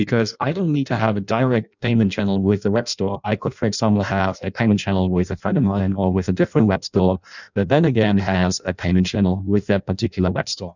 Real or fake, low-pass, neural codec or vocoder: fake; 7.2 kHz; codec, 16 kHz in and 24 kHz out, 0.6 kbps, FireRedTTS-2 codec